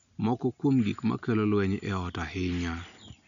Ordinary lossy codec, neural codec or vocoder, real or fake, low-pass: none; none; real; 7.2 kHz